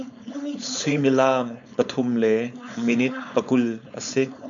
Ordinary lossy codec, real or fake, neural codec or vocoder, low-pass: MP3, 64 kbps; fake; codec, 16 kHz, 4.8 kbps, FACodec; 7.2 kHz